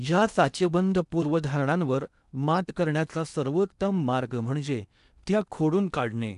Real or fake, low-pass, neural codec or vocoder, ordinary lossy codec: fake; 10.8 kHz; codec, 16 kHz in and 24 kHz out, 0.8 kbps, FocalCodec, streaming, 65536 codes; none